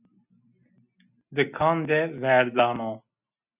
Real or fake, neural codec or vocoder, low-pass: real; none; 3.6 kHz